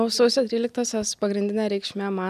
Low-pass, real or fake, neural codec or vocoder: 14.4 kHz; real; none